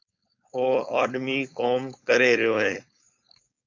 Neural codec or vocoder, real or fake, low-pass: codec, 16 kHz, 4.8 kbps, FACodec; fake; 7.2 kHz